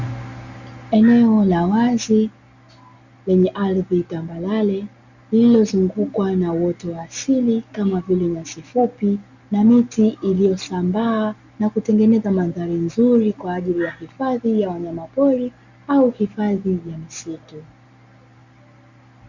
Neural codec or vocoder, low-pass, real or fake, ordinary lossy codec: none; 7.2 kHz; real; Opus, 64 kbps